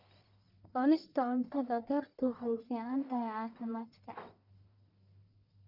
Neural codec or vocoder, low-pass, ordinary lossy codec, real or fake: codec, 44.1 kHz, 1.7 kbps, Pupu-Codec; 5.4 kHz; none; fake